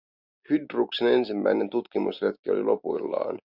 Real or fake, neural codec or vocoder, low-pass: real; none; 5.4 kHz